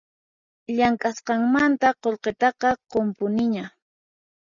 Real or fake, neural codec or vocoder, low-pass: real; none; 7.2 kHz